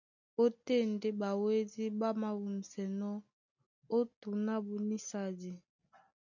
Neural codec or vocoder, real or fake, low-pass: none; real; 7.2 kHz